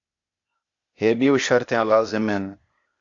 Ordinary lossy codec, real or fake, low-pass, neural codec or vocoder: AAC, 48 kbps; fake; 7.2 kHz; codec, 16 kHz, 0.8 kbps, ZipCodec